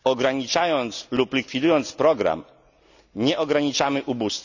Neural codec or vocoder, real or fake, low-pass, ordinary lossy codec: none; real; 7.2 kHz; none